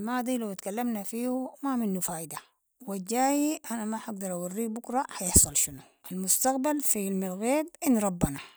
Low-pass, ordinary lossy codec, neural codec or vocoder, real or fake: none; none; none; real